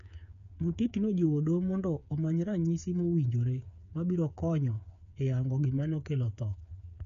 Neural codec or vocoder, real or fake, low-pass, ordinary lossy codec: codec, 16 kHz, 8 kbps, FreqCodec, smaller model; fake; 7.2 kHz; none